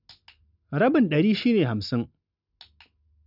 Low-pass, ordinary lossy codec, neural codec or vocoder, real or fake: 5.4 kHz; none; none; real